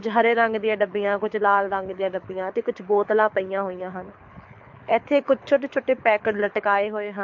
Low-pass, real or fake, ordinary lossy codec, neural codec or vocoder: 7.2 kHz; fake; MP3, 48 kbps; codec, 24 kHz, 6 kbps, HILCodec